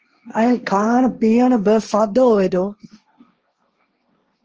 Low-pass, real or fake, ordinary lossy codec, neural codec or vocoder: 7.2 kHz; fake; Opus, 24 kbps; codec, 16 kHz, 1.1 kbps, Voila-Tokenizer